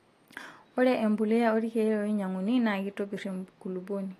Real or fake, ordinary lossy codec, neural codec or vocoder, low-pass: real; AAC, 48 kbps; none; 14.4 kHz